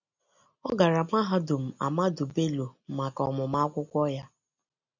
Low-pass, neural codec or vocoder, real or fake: 7.2 kHz; none; real